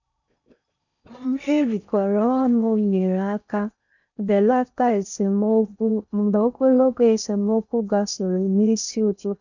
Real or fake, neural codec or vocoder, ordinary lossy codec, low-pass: fake; codec, 16 kHz in and 24 kHz out, 0.6 kbps, FocalCodec, streaming, 4096 codes; none; 7.2 kHz